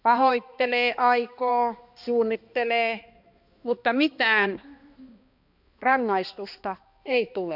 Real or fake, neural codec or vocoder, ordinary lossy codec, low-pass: fake; codec, 16 kHz, 2 kbps, X-Codec, HuBERT features, trained on balanced general audio; none; 5.4 kHz